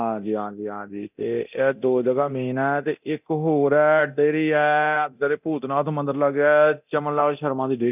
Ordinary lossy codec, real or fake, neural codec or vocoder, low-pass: none; fake; codec, 24 kHz, 0.9 kbps, DualCodec; 3.6 kHz